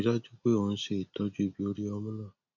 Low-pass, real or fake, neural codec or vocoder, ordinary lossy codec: 7.2 kHz; real; none; none